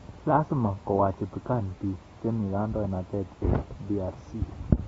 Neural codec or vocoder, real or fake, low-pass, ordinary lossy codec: none; real; 19.8 kHz; AAC, 24 kbps